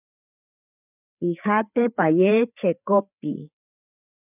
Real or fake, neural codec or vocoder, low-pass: fake; codec, 16 kHz, 4 kbps, FreqCodec, larger model; 3.6 kHz